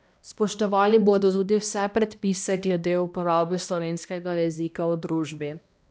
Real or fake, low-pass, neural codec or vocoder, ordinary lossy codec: fake; none; codec, 16 kHz, 1 kbps, X-Codec, HuBERT features, trained on balanced general audio; none